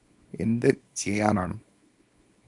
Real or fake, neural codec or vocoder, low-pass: fake; codec, 24 kHz, 0.9 kbps, WavTokenizer, small release; 10.8 kHz